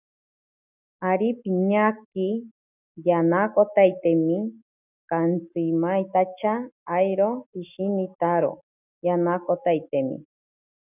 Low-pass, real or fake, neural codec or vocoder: 3.6 kHz; real; none